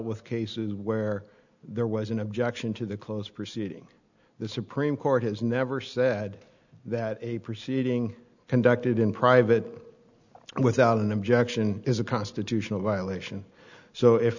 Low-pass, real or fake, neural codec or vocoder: 7.2 kHz; real; none